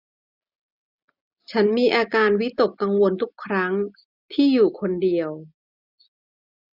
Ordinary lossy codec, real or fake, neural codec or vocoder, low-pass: none; real; none; 5.4 kHz